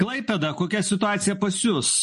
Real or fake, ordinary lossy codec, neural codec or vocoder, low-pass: real; MP3, 48 kbps; none; 14.4 kHz